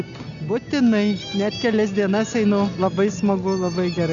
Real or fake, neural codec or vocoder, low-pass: real; none; 7.2 kHz